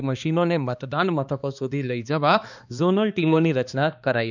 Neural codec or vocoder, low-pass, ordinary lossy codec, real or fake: codec, 16 kHz, 2 kbps, X-Codec, HuBERT features, trained on balanced general audio; 7.2 kHz; none; fake